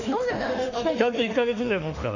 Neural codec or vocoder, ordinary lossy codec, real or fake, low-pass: autoencoder, 48 kHz, 32 numbers a frame, DAC-VAE, trained on Japanese speech; none; fake; 7.2 kHz